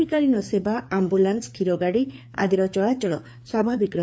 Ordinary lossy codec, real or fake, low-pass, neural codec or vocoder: none; fake; none; codec, 16 kHz, 4 kbps, FreqCodec, larger model